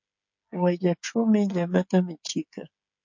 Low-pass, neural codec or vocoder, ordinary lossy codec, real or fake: 7.2 kHz; codec, 16 kHz, 16 kbps, FreqCodec, smaller model; MP3, 48 kbps; fake